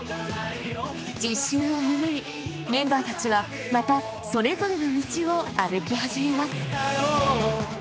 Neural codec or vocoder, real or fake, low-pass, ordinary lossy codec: codec, 16 kHz, 2 kbps, X-Codec, HuBERT features, trained on general audio; fake; none; none